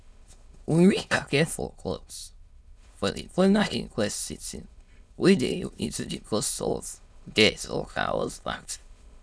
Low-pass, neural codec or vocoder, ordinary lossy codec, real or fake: none; autoencoder, 22.05 kHz, a latent of 192 numbers a frame, VITS, trained on many speakers; none; fake